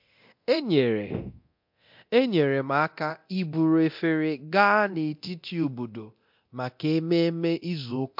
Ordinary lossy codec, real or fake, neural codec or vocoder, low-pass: MP3, 48 kbps; fake; codec, 24 kHz, 0.9 kbps, DualCodec; 5.4 kHz